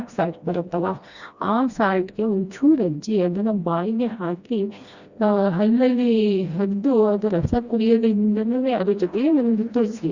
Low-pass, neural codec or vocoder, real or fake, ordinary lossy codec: 7.2 kHz; codec, 16 kHz, 1 kbps, FreqCodec, smaller model; fake; Opus, 64 kbps